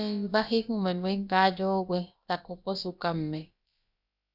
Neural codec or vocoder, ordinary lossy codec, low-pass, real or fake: codec, 16 kHz, about 1 kbps, DyCAST, with the encoder's durations; Opus, 64 kbps; 5.4 kHz; fake